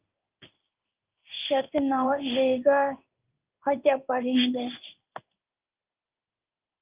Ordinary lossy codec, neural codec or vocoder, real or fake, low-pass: Opus, 24 kbps; codec, 16 kHz in and 24 kHz out, 1 kbps, XY-Tokenizer; fake; 3.6 kHz